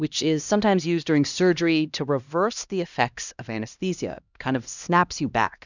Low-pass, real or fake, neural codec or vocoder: 7.2 kHz; fake; codec, 16 kHz, 1 kbps, X-Codec, HuBERT features, trained on LibriSpeech